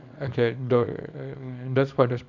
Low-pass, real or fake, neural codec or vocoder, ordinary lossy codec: 7.2 kHz; fake; codec, 24 kHz, 0.9 kbps, WavTokenizer, small release; none